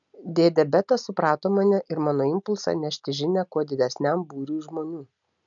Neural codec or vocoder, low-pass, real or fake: none; 7.2 kHz; real